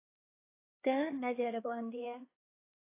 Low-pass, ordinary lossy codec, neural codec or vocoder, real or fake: 3.6 kHz; AAC, 24 kbps; codec, 16 kHz, 4 kbps, X-Codec, HuBERT features, trained on LibriSpeech; fake